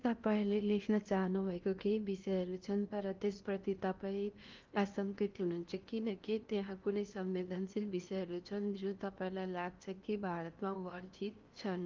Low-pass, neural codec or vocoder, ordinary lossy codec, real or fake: 7.2 kHz; codec, 16 kHz in and 24 kHz out, 0.8 kbps, FocalCodec, streaming, 65536 codes; Opus, 32 kbps; fake